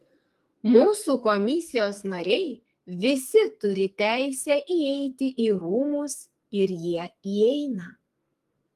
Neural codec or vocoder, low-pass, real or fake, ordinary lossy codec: codec, 44.1 kHz, 2.6 kbps, SNAC; 14.4 kHz; fake; Opus, 32 kbps